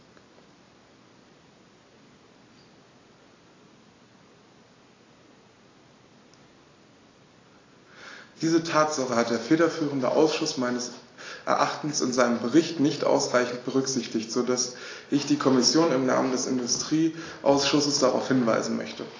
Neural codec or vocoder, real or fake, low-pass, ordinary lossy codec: none; real; 7.2 kHz; AAC, 32 kbps